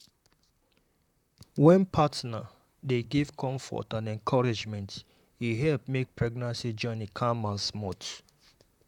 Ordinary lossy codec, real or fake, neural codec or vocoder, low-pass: none; fake; vocoder, 44.1 kHz, 128 mel bands, Pupu-Vocoder; 19.8 kHz